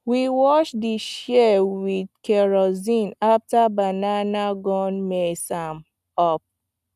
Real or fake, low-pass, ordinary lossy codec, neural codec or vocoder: real; 14.4 kHz; none; none